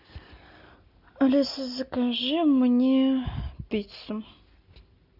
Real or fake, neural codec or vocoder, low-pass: real; none; 5.4 kHz